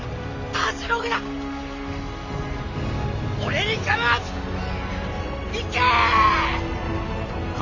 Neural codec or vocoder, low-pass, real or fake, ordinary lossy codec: none; 7.2 kHz; real; none